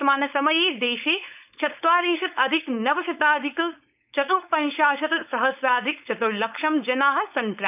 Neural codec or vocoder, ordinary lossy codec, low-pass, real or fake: codec, 16 kHz, 4.8 kbps, FACodec; none; 3.6 kHz; fake